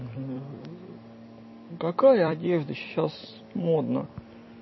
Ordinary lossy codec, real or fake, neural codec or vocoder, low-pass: MP3, 24 kbps; fake; vocoder, 44.1 kHz, 128 mel bands every 256 samples, BigVGAN v2; 7.2 kHz